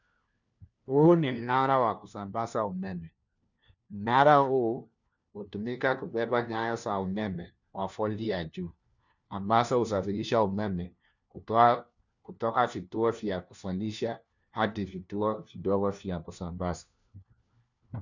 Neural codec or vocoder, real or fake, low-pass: codec, 16 kHz, 1 kbps, FunCodec, trained on LibriTTS, 50 frames a second; fake; 7.2 kHz